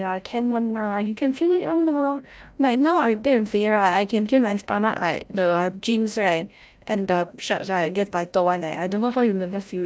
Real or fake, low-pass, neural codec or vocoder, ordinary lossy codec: fake; none; codec, 16 kHz, 0.5 kbps, FreqCodec, larger model; none